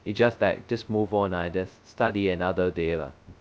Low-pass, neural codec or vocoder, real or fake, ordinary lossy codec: none; codec, 16 kHz, 0.2 kbps, FocalCodec; fake; none